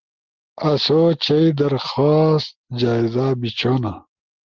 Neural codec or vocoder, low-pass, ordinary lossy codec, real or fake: none; 7.2 kHz; Opus, 16 kbps; real